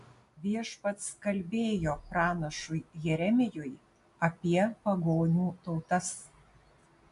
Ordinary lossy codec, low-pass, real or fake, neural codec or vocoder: AAC, 64 kbps; 10.8 kHz; real; none